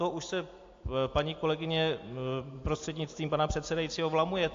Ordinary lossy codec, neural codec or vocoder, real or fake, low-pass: AAC, 48 kbps; none; real; 7.2 kHz